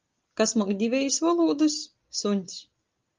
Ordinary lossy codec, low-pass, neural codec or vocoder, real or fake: Opus, 32 kbps; 7.2 kHz; none; real